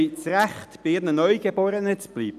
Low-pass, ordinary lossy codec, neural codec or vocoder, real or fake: 14.4 kHz; none; none; real